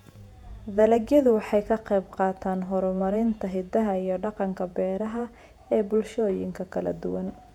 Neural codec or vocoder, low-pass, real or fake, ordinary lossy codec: vocoder, 48 kHz, 128 mel bands, Vocos; 19.8 kHz; fake; none